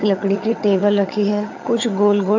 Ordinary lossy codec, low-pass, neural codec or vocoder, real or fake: AAC, 48 kbps; 7.2 kHz; vocoder, 22.05 kHz, 80 mel bands, HiFi-GAN; fake